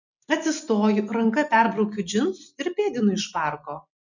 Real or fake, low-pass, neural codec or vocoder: real; 7.2 kHz; none